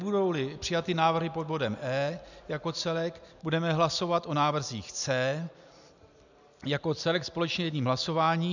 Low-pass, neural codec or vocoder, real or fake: 7.2 kHz; none; real